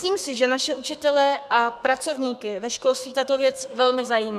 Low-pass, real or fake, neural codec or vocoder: 14.4 kHz; fake; codec, 32 kHz, 1.9 kbps, SNAC